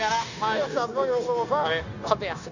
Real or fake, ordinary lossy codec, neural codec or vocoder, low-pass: fake; none; codec, 16 kHz, 0.9 kbps, LongCat-Audio-Codec; 7.2 kHz